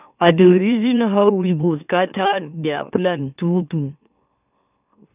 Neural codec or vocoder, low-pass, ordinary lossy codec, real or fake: autoencoder, 44.1 kHz, a latent of 192 numbers a frame, MeloTTS; 3.6 kHz; none; fake